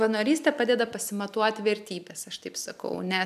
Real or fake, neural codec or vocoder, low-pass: real; none; 14.4 kHz